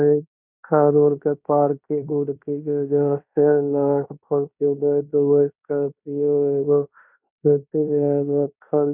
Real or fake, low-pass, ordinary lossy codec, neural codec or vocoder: fake; 3.6 kHz; none; codec, 16 kHz in and 24 kHz out, 0.9 kbps, LongCat-Audio-Codec, fine tuned four codebook decoder